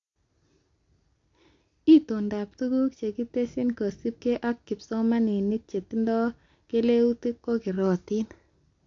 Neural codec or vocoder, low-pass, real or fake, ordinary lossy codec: none; 7.2 kHz; real; AAC, 48 kbps